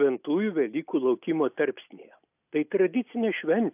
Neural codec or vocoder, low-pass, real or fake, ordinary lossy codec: none; 3.6 kHz; real; AAC, 32 kbps